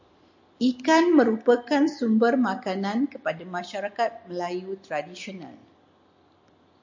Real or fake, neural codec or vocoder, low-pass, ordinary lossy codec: real; none; 7.2 kHz; MP3, 48 kbps